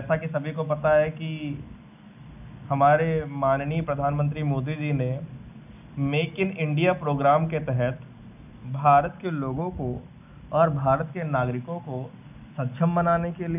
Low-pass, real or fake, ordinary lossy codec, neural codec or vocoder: 3.6 kHz; real; none; none